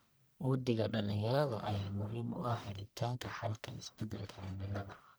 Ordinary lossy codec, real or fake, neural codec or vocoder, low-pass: none; fake; codec, 44.1 kHz, 1.7 kbps, Pupu-Codec; none